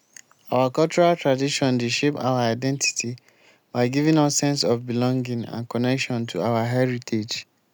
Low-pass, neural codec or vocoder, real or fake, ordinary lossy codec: none; none; real; none